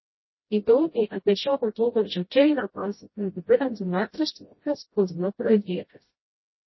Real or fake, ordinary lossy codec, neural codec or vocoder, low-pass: fake; MP3, 24 kbps; codec, 16 kHz, 0.5 kbps, FreqCodec, smaller model; 7.2 kHz